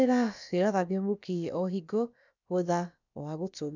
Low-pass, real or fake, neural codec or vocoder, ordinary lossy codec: 7.2 kHz; fake; codec, 16 kHz, about 1 kbps, DyCAST, with the encoder's durations; none